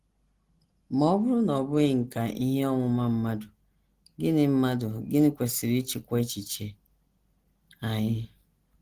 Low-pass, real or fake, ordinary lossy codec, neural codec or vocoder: 14.4 kHz; real; Opus, 16 kbps; none